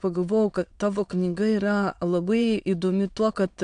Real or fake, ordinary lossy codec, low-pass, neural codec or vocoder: fake; AAC, 64 kbps; 9.9 kHz; autoencoder, 22.05 kHz, a latent of 192 numbers a frame, VITS, trained on many speakers